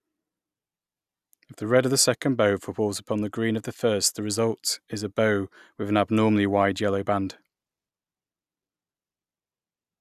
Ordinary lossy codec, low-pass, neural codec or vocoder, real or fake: none; 14.4 kHz; none; real